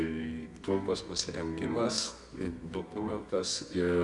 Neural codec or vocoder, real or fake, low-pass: codec, 24 kHz, 0.9 kbps, WavTokenizer, medium music audio release; fake; 10.8 kHz